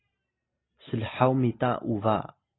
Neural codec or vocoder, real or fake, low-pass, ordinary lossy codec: none; real; 7.2 kHz; AAC, 16 kbps